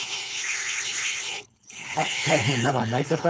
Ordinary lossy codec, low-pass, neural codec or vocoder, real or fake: none; none; codec, 16 kHz, 4.8 kbps, FACodec; fake